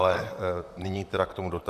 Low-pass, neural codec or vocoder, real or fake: 14.4 kHz; vocoder, 44.1 kHz, 128 mel bands, Pupu-Vocoder; fake